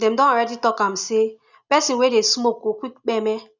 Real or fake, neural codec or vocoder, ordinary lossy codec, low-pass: real; none; none; 7.2 kHz